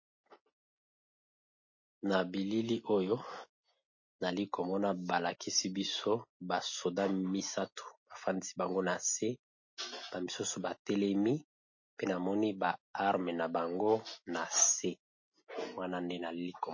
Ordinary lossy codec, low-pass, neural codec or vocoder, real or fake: MP3, 32 kbps; 7.2 kHz; none; real